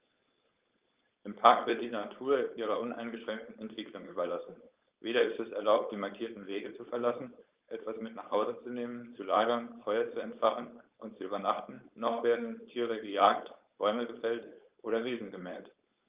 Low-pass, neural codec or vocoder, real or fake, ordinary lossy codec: 3.6 kHz; codec, 16 kHz, 4.8 kbps, FACodec; fake; Opus, 32 kbps